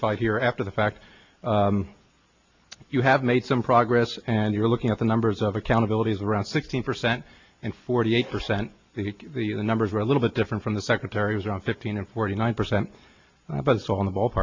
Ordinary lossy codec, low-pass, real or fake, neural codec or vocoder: MP3, 64 kbps; 7.2 kHz; real; none